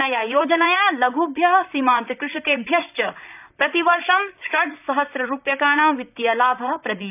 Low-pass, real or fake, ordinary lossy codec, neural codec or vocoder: 3.6 kHz; fake; none; vocoder, 44.1 kHz, 128 mel bands, Pupu-Vocoder